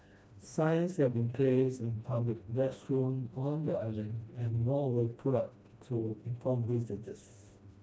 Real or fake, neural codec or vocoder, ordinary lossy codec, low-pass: fake; codec, 16 kHz, 1 kbps, FreqCodec, smaller model; none; none